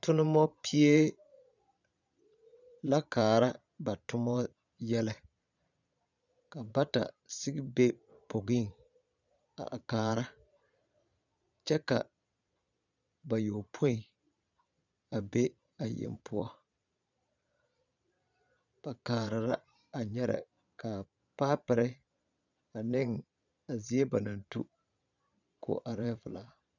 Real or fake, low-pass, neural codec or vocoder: fake; 7.2 kHz; vocoder, 22.05 kHz, 80 mel bands, Vocos